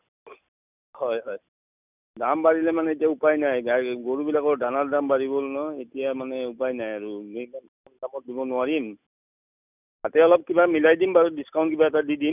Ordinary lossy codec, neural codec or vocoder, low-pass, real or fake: none; none; 3.6 kHz; real